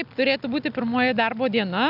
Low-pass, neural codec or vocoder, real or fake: 5.4 kHz; none; real